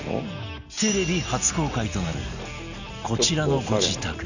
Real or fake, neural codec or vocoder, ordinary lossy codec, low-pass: real; none; none; 7.2 kHz